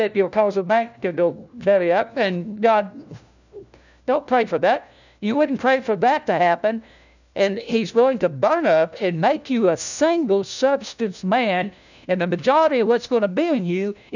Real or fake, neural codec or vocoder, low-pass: fake; codec, 16 kHz, 1 kbps, FunCodec, trained on LibriTTS, 50 frames a second; 7.2 kHz